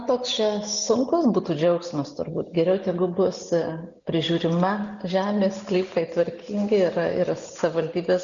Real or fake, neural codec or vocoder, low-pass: real; none; 7.2 kHz